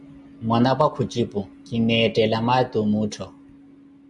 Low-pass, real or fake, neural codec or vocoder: 10.8 kHz; real; none